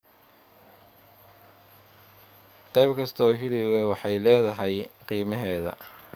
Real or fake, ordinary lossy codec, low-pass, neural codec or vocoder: fake; none; none; codec, 44.1 kHz, 7.8 kbps, DAC